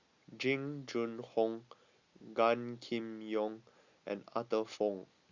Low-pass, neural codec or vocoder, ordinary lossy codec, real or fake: 7.2 kHz; none; Opus, 64 kbps; real